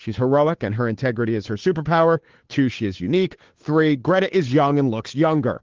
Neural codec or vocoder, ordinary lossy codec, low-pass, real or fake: codec, 16 kHz, 2 kbps, FunCodec, trained on Chinese and English, 25 frames a second; Opus, 16 kbps; 7.2 kHz; fake